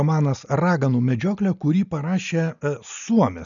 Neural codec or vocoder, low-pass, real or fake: none; 7.2 kHz; real